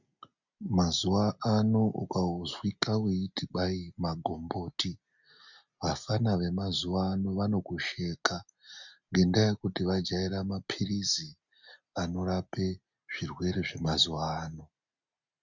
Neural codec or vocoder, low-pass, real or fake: none; 7.2 kHz; real